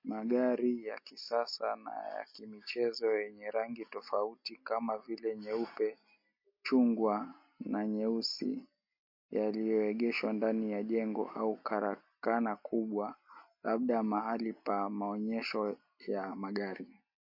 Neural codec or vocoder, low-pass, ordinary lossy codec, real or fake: none; 5.4 kHz; MP3, 32 kbps; real